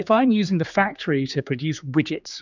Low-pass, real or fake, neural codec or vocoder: 7.2 kHz; fake; codec, 16 kHz, 4 kbps, X-Codec, HuBERT features, trained on general audio